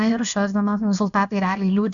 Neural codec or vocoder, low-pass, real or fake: codec, 16 kHz, about 1 kbps, DyCAST, with the encoder's durations; 7.2 kHz; fake